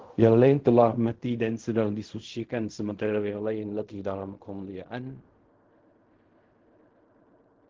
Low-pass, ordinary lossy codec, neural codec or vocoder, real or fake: 7.2 kHz; Opus, 16 kbps; codec, 16 kHz in and 24 kHz out, 0.4 kbps, LongCat-Audio-Codec, fine tuned four codebook decoder; fake